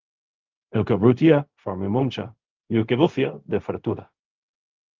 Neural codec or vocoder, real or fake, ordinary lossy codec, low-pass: codec, 16 kHz, 0.4 kbps, LongCat-Audio-Codec; fake; Opus, 32 kbps; 7.2 kHz